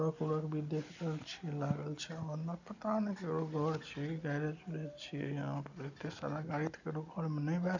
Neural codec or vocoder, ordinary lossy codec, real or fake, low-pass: none; none; real; 7.2 kHz